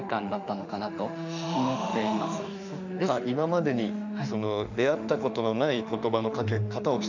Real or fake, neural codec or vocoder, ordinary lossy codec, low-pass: fake; autoencoder, 48 kHz, 32 numbers a frame, DAC-VAE, trained on Japanese speech; none; 7.2 kHz